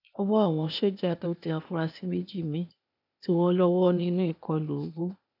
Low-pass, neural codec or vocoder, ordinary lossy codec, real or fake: 5.4 kHz; codec, 16 kHz, 0.8 kbps, ZipCodec; none; fake